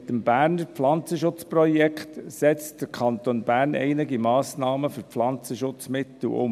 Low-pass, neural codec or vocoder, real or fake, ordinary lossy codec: 14.4 kHz; none; real; MP3, 96 kbps